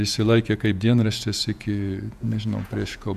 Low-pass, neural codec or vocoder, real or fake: 14.4 kHz; vocoder, 48 kHz, 128 mel bands, Vocos; fake